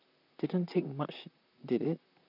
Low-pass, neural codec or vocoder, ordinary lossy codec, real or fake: 5.4 kHz; vocoder, 44.1 kHz, 128 mel bands, Pupu-Vocoder; none; fake